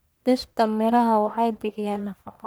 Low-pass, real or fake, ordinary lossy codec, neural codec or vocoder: none; fake; none; codec, 44.1 kHz, 1.7 kbps, Pupu-Codec